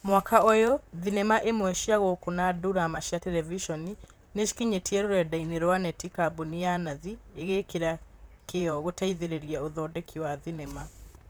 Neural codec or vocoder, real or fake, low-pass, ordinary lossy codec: vocoder, 44.1 kHz, 128 mel bands, Pupu-Vocoder; fake; none; none